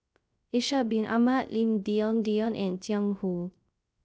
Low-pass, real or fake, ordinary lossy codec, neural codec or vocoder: none; fake; none; codec, 16 kHz, 0.3 kbps, FocalCodec